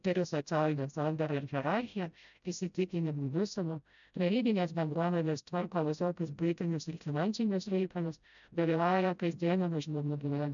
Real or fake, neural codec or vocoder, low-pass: fake; codec, 16 kHz, 0.5 kbps, FreqCodec, smaller model; 7.2 kHz